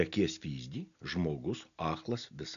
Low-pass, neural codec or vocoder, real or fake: 7.2 kHz; none; real